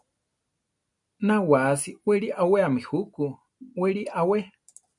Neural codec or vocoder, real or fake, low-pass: none; real; 10.8 kHz